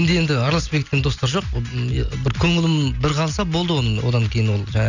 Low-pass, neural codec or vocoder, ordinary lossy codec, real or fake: 7.2 kHz; none; none; real